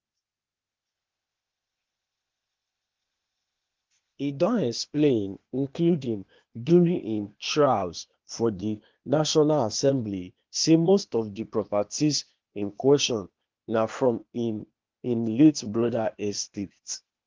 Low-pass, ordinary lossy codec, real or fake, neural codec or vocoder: 7.2 kHz; Opus, 24 kbps; fake; codec, 16 kHz, 0.8 kbps, ZipCodec